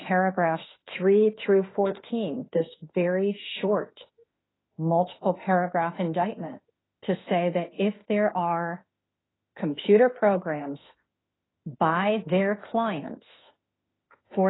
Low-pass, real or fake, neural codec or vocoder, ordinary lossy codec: 7.2 kHz; fake; autoencoder, 48 kHz, 32 numbers a frame, DAC-VAE, trained on Japanese speech; AAC, 16 kbps